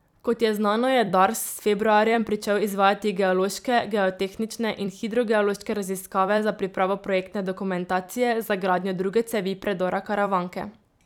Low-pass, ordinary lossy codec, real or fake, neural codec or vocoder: 19.8 kHz; none; fake; vocoder, 44.1 kHz, 128 mel bands every 256 samples, BigVGAN v2